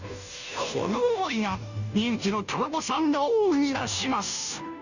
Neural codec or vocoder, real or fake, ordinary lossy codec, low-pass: codec, 16 kHz, 0.5 kbps, FunCodec, trained on Chinese and English, 25 frames a second; fake; none; 7.2 kHz